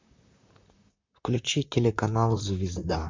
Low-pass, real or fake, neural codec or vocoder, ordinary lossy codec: 7.2 kHz; fake; vocoder, 44.1 kHz, 128 mel bands, Pupu-Vocoder; MP3, 64 kbps